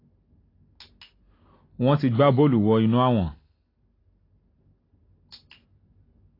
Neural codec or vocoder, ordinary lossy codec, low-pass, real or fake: none; AAC, 24 kbps; 5.4 kHz; real